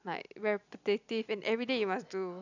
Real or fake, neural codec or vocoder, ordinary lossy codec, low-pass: real; none; none; 7.2 kHz